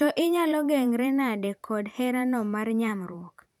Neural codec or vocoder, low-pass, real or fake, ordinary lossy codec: vocoder, 44.1 kHz, 128 mel bands every 512 samples, BigVGAN v2; 19.8 kHz; fake; none